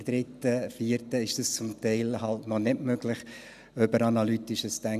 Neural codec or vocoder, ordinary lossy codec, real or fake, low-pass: none; none; real; 14.4 kHz